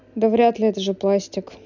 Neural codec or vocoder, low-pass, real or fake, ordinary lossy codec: none; 7.2 kHz; real; none